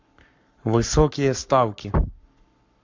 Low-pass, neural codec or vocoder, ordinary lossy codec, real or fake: 7.2 kHz; codec, 44.1 kHz, 7.8 kbps, Pupu-Codec; MP3, 64 kbps; fake